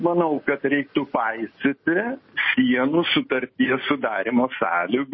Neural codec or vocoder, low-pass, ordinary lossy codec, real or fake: none; 7.2 kHz; MP3, 24 kbps; real